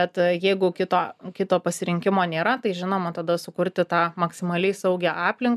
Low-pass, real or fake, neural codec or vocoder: 14.4 kHz; real; none